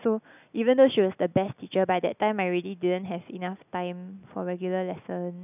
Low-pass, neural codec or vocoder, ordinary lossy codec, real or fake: 3.6 kHz; none; none; real